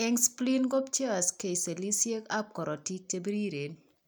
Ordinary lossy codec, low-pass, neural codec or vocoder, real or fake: none; none; none; real